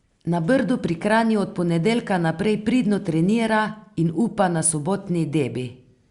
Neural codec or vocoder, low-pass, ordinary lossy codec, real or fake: none; 10.8 kHz; Opus, 64 kbps; real